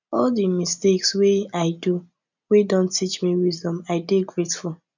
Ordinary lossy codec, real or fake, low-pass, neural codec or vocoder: none; real; 7.2 kHz; none